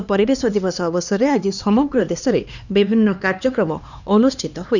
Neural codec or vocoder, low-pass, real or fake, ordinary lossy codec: codec, 16 kHz, 2 kbps, X-Codec, HuBERT features, trained on LibriSpeech; 7.2 kHz; fake; none